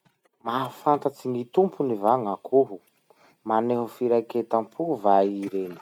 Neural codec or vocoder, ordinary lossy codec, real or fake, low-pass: none; none; real; 19.8 kHz